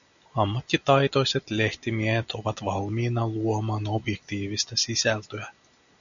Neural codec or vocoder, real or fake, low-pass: none; real; 7.2 kHz